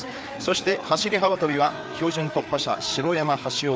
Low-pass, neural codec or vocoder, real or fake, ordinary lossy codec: none; codec, 16 kHz, 4 kbps, FreqCodec, larger model; fake; none